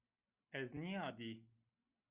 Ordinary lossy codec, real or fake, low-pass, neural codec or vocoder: Opus, 64 kbps; real; 3.6 kHz; none